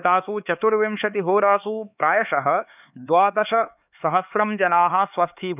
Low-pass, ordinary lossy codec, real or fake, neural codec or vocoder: 3.6 kHz; none; fake; codec, 16 kHz, 4 kbps, X-Codec, HuBERT features, trained on LibriSpeech